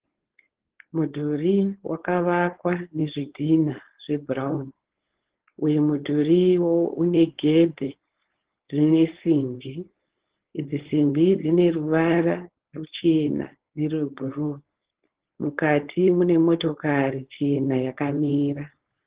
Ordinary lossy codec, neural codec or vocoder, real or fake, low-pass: Opus, 16 kbps; codec, 16 kHz, 4.8 kbps, FACodec; fake; 3.6 kHz